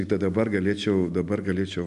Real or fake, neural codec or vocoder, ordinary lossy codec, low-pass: real; none; MP3, 96 kbps; 10.8 kHz